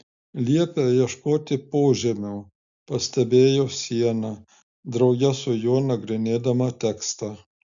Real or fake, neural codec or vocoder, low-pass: real; none; 7.2 kHz